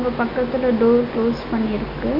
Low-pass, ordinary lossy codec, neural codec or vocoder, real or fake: 5.4 kHz; MP3, 32 kbps; none; real